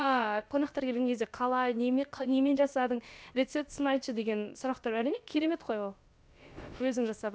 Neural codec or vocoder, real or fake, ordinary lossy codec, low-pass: codec, 16 kHz, about 1 kbps, DyCAST, with the encoder's durations; fake; none; none